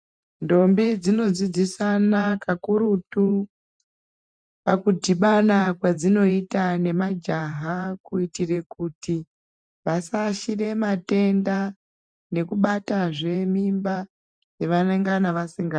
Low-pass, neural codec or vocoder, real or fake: 9.9 kHz; vocoder, 44.1 kHz, 128 mel bands every 512 samples, BigVGAN v2; fake